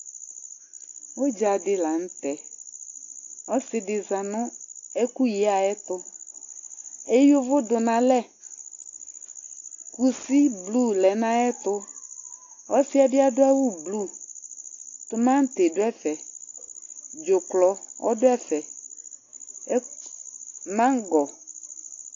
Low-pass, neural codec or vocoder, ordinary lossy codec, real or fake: 7.2 kHz; none; AAC, 48 kbps; real